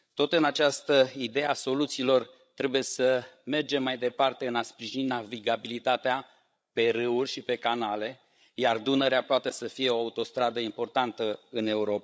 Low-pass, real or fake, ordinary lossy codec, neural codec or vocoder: none; fake; none; codec, 16 kHz, 16 kbps, FreqCodec, larger model